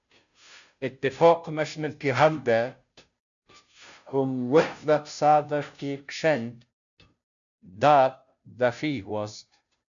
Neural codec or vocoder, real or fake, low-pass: codec, 16 kHz, 0.5 kbps, FunCodec, trained on Chinese and English, 25 frames a second; fake; 7.2 kHz